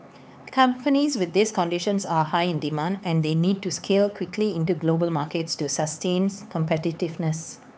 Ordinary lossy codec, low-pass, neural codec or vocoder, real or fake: none; none; codec, 16 kHz, 4 kbps, X-Codec, HuBERT features, trained on LibriSpeech; fake